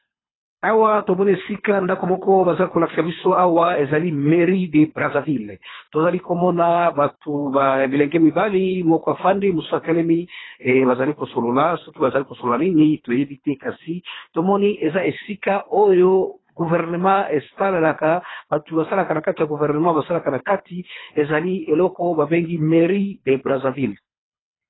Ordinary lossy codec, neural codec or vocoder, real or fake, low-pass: AAC, 16 kbps; codec, 24 kHz, 3 kbps, HILCodec; fake; 7.2 kHz